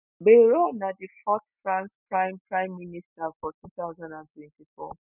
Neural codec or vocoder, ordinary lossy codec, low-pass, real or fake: none; none; 3.6 kHz; real